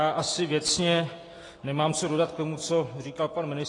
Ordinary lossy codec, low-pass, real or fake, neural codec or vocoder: AAC, 32 kbps; 10.8 kHz; real; none